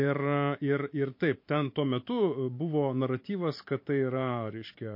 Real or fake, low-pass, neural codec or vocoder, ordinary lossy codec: fake; 5.4 kHz; vocoder, 44.1 kHz, 128 mel bands every 512 samples, BigVGAN v2; MP3, 32 kbps